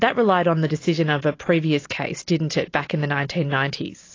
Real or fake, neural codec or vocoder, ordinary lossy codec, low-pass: real; none; AAC, 32 kbps; 7.2 kHz